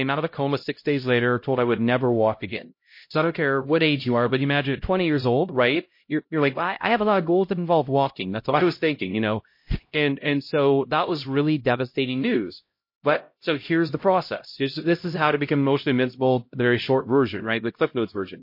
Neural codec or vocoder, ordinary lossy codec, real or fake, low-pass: codec, 16 kHz, 0.5 kbps, X-Codec, HuBERT features, trained on LibriSpeech; MP3, 32 kbps; fake; 5.4 kHz